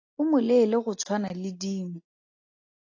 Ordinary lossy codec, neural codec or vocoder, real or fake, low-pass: AAC, 48 kbps; none; real; 7.2 kHz